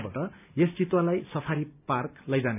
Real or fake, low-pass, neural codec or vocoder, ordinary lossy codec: real; 3.6 kHz; none; none